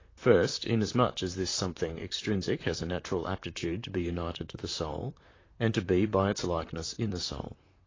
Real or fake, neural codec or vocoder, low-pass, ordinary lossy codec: fake; vocoder, 44.1 kHz, 128 mel bands, Pupu-Vocoder; 7.2 kHz; AAC, 32 kbps